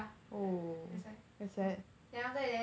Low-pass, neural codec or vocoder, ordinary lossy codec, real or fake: none; none; none; real